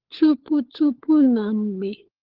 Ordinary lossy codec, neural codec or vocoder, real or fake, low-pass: Opus, 16 kbps; codec, 16 kHz, 4 kbps, FunCodec, trained on LibriTTS, 50 frames a second; fake; 5.4 kHz